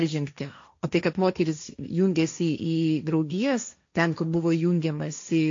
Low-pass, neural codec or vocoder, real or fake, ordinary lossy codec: 7.2 kHz; codec, 16 kHz, 1.1 kbps, Voila-Tokenizer; fake; AAC, 48 kbps